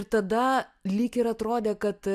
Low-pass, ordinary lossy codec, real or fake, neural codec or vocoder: 14.4 kHz; AAC, 96 kbps; real; none